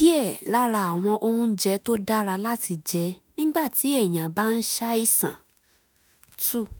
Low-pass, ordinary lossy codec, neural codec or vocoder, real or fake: none; none; autoencoder, 48 kHz, 32 numbers a frame, DAC-VAE, trained on Japanese speech; fake